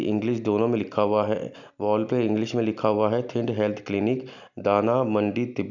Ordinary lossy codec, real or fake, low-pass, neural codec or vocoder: none; real; 7.2 kHz; none